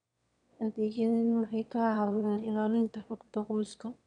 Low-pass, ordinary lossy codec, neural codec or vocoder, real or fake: 9.9 kHz; none; autoencoder, 22.05 kHz, a latent of 192 numbers a frame, VITS, trained on one speaker; fake